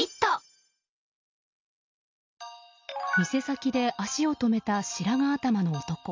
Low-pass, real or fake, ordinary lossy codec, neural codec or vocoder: 7.2 kHz; real; MP3, 64 kbps; none